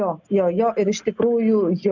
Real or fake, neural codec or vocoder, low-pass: real; none; 7.2 kHz